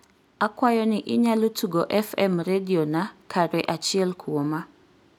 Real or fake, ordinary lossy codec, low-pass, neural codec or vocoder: real; none; 19.8 kHz; none